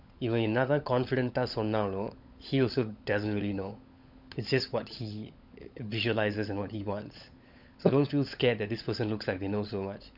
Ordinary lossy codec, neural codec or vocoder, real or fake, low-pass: none; codec, 16 kHz, 16 kbps, FunCodec, trained on LibriTTS, 50 frames a second; fake; 5.4 kHz